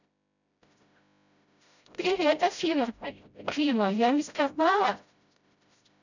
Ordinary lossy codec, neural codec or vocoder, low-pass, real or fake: none; codec, 16 kHz, 0.5 kbps, FreqCodec, smaller model; 7.2 kHz; fake